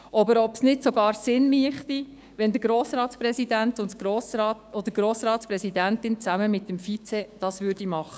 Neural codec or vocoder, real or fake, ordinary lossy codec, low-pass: codec, 16 kHz, 6 kbps, DAC; fake; none; none